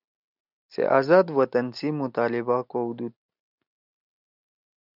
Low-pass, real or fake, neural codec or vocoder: 5.4 kHz; real; none